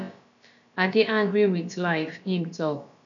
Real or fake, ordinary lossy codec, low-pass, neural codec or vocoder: fake; none; 7.2 kHz; codec, 16 kHz, about 1 kbps, DyCAST, with the encoder's durations